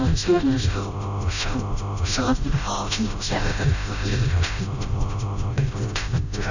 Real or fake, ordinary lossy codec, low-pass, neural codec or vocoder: fake; none; 7.2 kHz; codec, 16 kHz, 0.5 kbps, FreqCodec, smaller model